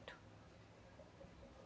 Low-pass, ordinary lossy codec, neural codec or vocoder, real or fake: none; none; none; real